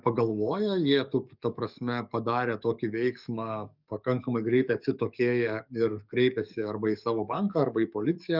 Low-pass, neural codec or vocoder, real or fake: 5.4 kHz; codec, 44.1 kHz, 7.8 kbps, DAC; fake